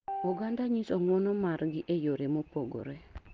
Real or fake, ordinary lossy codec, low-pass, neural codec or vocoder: real; Opus, 16 kbps; 7.2 kHz; none